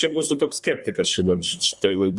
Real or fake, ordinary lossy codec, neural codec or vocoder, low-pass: fake; Opus, 64 kbps; codec, 24 kHz, 1 kbps, SNAC; 10.8 kHz